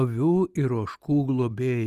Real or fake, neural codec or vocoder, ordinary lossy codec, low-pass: real; none; Opus, 32 kbps; 14.4 kHz